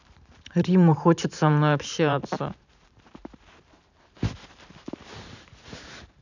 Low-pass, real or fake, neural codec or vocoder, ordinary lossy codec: 7.2 kHz; fake; vocoder, 44.1 kHz, 128 mel bands every 512 samples, BigVGAN v2; none